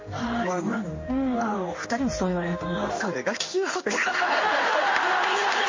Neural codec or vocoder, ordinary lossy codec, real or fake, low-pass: codec, 16 kHz in and 24 kHz out, 1 kbps, XY-Tokenizer; MP3, 32 kbps; fake; 7.2 kHz